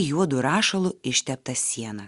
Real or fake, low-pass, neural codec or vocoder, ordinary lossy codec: real; 10.8 kHz; none; Opus, 64 kbps